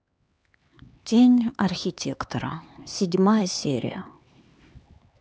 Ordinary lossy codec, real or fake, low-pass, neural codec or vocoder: none; fake; none; codec, 16 kHz, 4 kbps, X-Codec, HuBERT features, trained on LibriSpeech